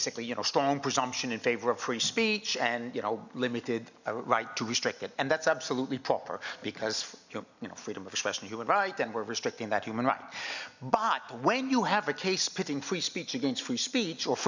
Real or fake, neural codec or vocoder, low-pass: real; none; 7.2 kHz